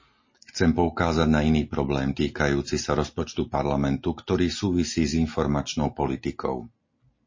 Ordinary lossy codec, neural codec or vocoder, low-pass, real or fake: MP3, 32 kbps; none; 7.2 kHz; real